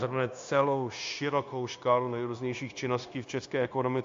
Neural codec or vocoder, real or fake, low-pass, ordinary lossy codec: codec, 16 kHz, 0.9 kbps, LongCat-Audio-Codec; fake; 7.2 kHz; AAC, 48 kbps